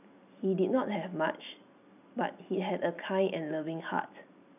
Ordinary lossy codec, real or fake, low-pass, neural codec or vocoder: none; real; 3.6 kHz; none